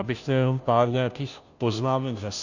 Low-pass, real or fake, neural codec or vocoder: 7.2 kHz; fake; codec, 16 kHz, 0.5 kbps, FunCodec, trained on Chinese and English, 25 frames a second